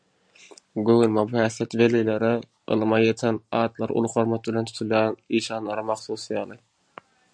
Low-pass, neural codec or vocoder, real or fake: 9.9 kHz; none; real